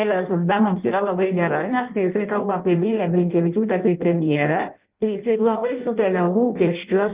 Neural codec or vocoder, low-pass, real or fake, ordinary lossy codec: codec, 16 kHz in and 24 kHz out, 0.6 kbps, FireRedTTS-2 codec; 3.6 kHz; fake; Opus, 32 kbps